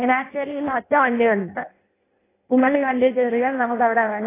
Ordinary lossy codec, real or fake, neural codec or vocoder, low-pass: AAC, 16 kbps; fake; codec, 16 kHz in and 24 kHz out, 0.6 kbps, FireRedTTS-2 codec; 3.6 kHz